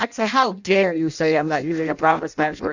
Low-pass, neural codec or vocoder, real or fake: 7.2 kHz; codec, 16 kHz in and 24 kHz out, 0.6 kbps, FireRedTTS-2 codec; fake